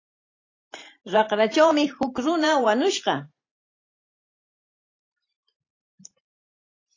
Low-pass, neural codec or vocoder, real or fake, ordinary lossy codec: 7.2 kHz; vocoder, 44.1 kHz, 128 mel bands every 512 samples, BigVGAN v2; fake; AAC, 32 kbps